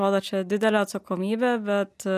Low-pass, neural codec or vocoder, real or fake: 14.4 kHz; none; real